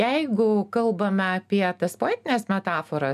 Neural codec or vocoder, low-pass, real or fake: none; 14.4 kHz; real